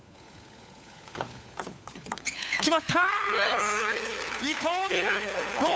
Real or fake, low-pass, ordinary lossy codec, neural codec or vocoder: fake; none; none; codec, 16 kHz, 4 kbps, FunCodec, trained on LibriTTS, 50 frames a second